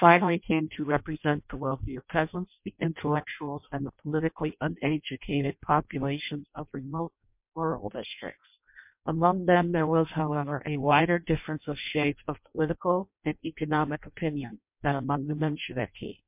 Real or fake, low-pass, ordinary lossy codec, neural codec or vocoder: fake; 3.6 kHz; MP3, 32 kbps; codec, 16 kHz in and 24 kHz out, 0.6 kbps, FireRedTTS-2 codec